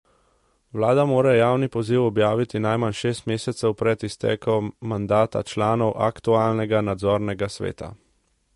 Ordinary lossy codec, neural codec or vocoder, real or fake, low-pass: MP3, 48 kbps; none; real; 14.4 kHz